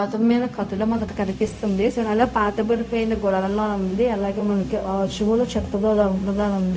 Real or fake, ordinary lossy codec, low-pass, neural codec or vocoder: fake; none; none; codec, 16 kHz, 0.4 kbps, LongCat-Audio-Codec